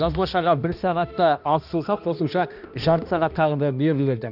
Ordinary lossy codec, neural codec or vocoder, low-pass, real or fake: none; codec, 16 kHz, 1 kbps, X-Codec, HuBERT features, trained on balanced general audio; 5.4 kHz; fake